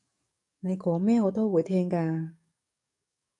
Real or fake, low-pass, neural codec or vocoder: fake; 10.8 kHz; codec, 44.1 kHz, 7.8 kbps, DAC